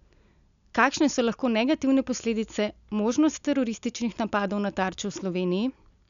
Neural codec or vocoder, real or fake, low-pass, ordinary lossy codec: none; real; 7.2 kHz; none